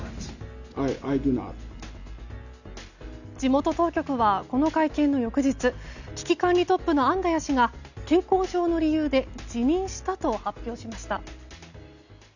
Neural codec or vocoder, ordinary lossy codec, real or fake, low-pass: none; none; real; 7.2 kHz